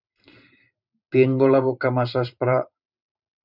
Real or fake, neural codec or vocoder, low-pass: fake; vocoder, 44.1 kHz, 128 mel bands every 512 samples, BigVGAN v2; 5.4 kHz